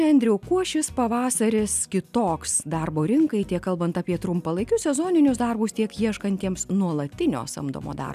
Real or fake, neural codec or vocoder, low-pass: real; none; 14.4 kHz